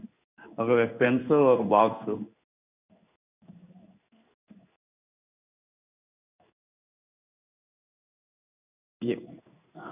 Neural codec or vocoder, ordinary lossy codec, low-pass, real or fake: codec, 16 kHz in and 24 kHz out, 1 kbps, XY-Tokenizer; none; 3.6 kHz; fake